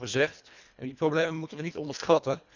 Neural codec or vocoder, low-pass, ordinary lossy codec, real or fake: codec, 24 kHz, 1.5 kbps, HILCodec; 7.2 kHz; none; fake